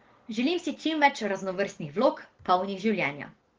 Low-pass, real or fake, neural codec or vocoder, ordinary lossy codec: 7.2 kHz; real; none; Opus, 16 kbps